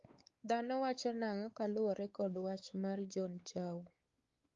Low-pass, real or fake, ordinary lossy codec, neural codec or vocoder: 7.2 kHz; fake; Opus, 32 kbps; codec, 16 kHz, 4 kbps, X-Codec, WavLM features, trained on Multilingual LibriSpeech